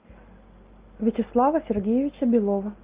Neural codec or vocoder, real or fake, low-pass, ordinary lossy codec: none; real; 3.6 kHz; Opus, 32 kbps